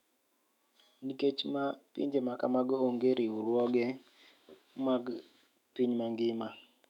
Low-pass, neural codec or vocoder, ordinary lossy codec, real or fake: 19.8 kHz; autoencoder, 48 kHz, 128 numbers a frame, DAC-VAE, trained on Japanese speech; none; fake